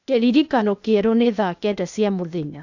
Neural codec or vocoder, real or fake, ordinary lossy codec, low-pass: codec, 16 kHz, 0.8 kbps, ZipCodec; fake; none; 7.2 kHz